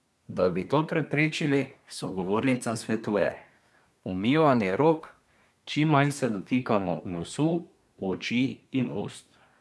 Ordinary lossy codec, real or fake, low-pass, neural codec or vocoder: none; fake; none; codec, 24 kHz, 1 kbps, SNAC